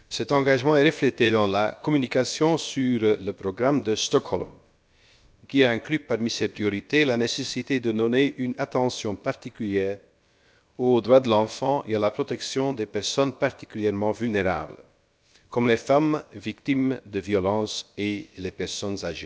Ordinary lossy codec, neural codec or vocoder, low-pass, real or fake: none; codec, 16 kHz, about 1 kbps, DyCAST, with the encoder's durations; none; fake